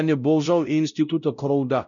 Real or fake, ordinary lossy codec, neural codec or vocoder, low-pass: fake; none; codec, 16 kHz, 0.5 kbps, X-Codec, WavLM features, trained on Multilingual LibriSpeech; 7.2 kHz